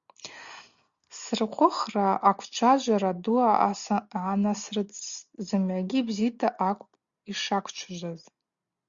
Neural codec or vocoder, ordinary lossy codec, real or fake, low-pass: none; Opus, 64 kbps; real; 7.2 kHz